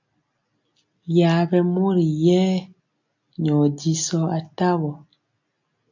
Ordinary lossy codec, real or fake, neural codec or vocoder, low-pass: AAC, 48 kbps; real; none; 7.2 kHz